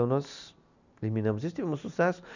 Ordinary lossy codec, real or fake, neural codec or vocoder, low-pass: none; real; none; 7.2 kHz